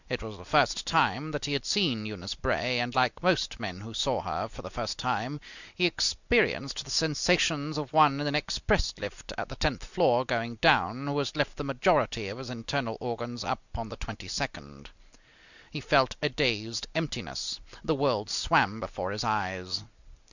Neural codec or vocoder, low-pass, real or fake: none; 7.2 kHz; real